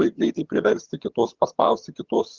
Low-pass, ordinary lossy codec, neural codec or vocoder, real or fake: 7.2 kHz; Opus, 16 kbps; vocoder, 22.05 kHz, 80 mel bands, HiFi-GAN; fake